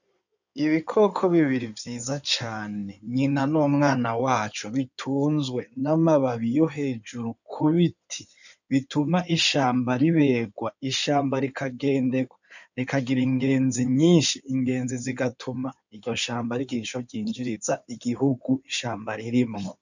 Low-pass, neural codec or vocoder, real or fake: 7.2 kHz; codec, 16 kHz in and 24 kHz out, 2.2 kbps, FireRedTTS-2 codec; fake